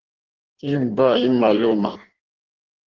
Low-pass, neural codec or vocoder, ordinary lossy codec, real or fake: 7.2 kHz; codec, 16 kHz in and 24 kHz out, 1.1 kbps, FireRedTTS-2 codec; Opus, 16 kbps; fake